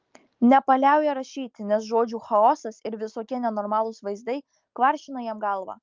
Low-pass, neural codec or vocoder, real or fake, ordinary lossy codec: 7.2 kHz; none; real; Opus, 32 kbps